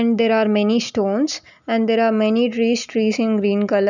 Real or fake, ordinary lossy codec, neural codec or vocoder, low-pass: real; none; none; 7.2 kHz